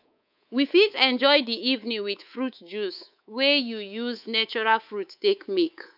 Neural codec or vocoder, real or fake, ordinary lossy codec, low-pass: codec, 24 kHz, 3.1 kbps, DualCodec; fake; none; 5.4 kHz